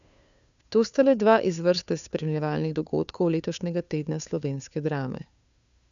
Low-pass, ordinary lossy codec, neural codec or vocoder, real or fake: 7.2 kHz; none; codec, 16 kHz, 2 kbps, FunCodec, trained on Chinese and English, 25 frames a second; fake